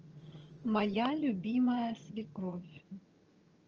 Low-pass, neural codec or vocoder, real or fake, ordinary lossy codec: 7.2 kHz; vocoder, 22.05 kHz, 80 mel bands, HiFi-GAN; fake; Opus, 24 kbps